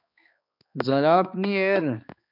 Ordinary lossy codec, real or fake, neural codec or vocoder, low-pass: MP3, 48 kbps; fake; codec, 16 kHz, 2 kbps, X-Codec, HuBERT features, trained on balanced general audio; 5.4 kHz